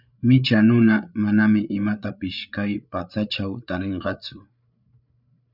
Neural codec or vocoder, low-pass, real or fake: codec, 16 kHz, 8 kbps, FreqCodec, larger model; 5.4 kHz; fake